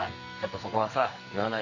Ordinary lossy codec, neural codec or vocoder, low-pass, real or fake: none; codec, 44.1 kHz, 2.6 kbps, SNAC; 7.2 kHz; fake